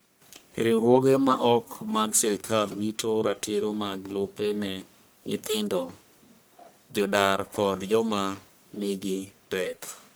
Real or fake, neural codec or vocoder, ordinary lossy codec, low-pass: fake; codec, 44.1 kHz, 1.7 kbps, Pupu-Codec; none; none